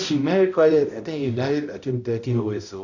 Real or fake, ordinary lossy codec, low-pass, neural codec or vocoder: fake; none; 7.2 kHz; codec, 16 kHz, 0.5 kbps, X-Codec, HuBERT features, trained on balanced general audio